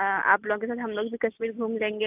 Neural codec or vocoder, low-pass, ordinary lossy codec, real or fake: none; 3.6 kHz; AAC, 32 kbps; real